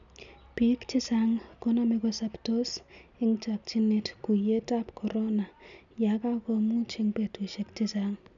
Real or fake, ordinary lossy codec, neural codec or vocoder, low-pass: real; none; none; 7.2 kHz